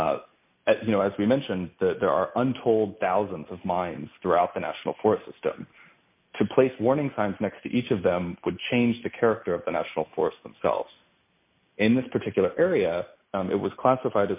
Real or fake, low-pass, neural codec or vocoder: real; 3.6 kHz; none